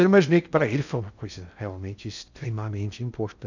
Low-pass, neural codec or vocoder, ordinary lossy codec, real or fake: 7.2 kHz; codec, 16 kHz in and 24 kHz out, 0.6 kbps, FocalCodec, streaming, 2048 codes; none; fake